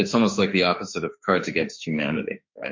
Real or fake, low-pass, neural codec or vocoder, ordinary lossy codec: fake; 7.2 kHz; autoencoder, 48 kHz, 32 numbers a frame, DAC-VAE, trained on Japanese speech; MP3, 48 kbps